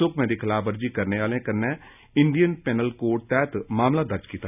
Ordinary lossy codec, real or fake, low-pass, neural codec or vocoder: none; real; 3.6 kHz; none